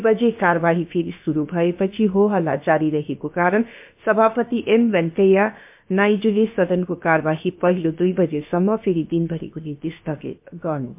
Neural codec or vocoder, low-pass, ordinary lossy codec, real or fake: codec, 16 kHz, about 1 kbps, DyCAST, with the encoder's durations; 3.6 kHz; MP3, 32 kbps; fake